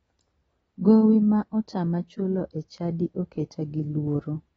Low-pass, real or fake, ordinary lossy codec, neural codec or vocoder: 9.9 kHz; real; AAC, 24 kbps; none